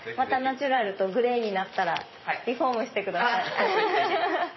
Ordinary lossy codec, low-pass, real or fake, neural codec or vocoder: MP3, 24 kbps; 7.2 kHz; fake; vocoder, 44.1 kHz, 128 mel bands every 512 samples, BigVGAN v2